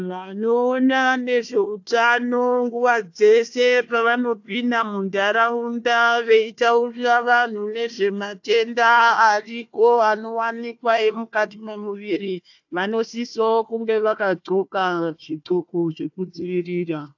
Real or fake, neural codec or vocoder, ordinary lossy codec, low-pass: fake; codec, 16 kHz, 1 kbps, FunCodec, trained on Chinese and English, 50 frames a second; AAC, 48 kbps; 7.2 kHz